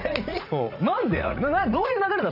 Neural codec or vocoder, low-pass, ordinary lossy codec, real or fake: vocoder, 44.1 kHz, 80 mel bands, Vocos; 5.4 kHz; none; fake